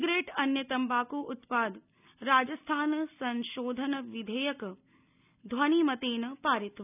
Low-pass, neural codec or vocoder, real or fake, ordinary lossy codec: 3.6 kHz; none; real; none